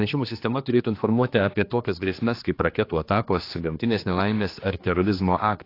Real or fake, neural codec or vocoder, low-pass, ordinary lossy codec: fake; codec, 16 kHz, 2 kbps, X-Codec, HuBERT features, trained on general audio; 5.4 kHz; AAC, 32 kbps